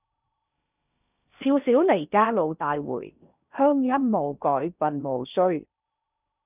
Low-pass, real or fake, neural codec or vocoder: 3.6 kHz; fake; codec, 16 kHz in and 24 kHz out, 0.6 kbps, FocalCodec, streaming, 4096 codes